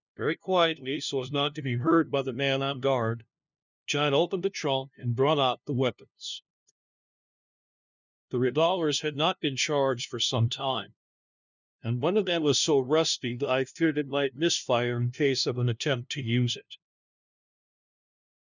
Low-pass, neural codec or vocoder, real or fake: 7.2 kHz; codec, 16 kHz, 0.5 kbps, FunCodec, trained on LibriTTS, 25 frames a second; fake